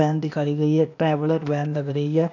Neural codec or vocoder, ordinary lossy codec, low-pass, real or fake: codec, 16 kHz, 0.8 kbps, ZipCodec; none; 7.2 kHz; fake